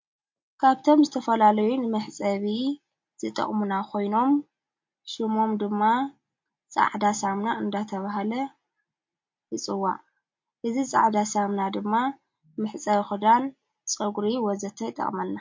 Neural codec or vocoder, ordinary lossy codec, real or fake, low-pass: none; MP3, 48 kbps; real; 7.2 kHz